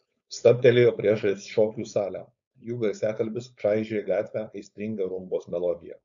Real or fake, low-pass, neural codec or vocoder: fake; 7.2 kHz; codec, 16 kHz, 4.8 kbps, FACodec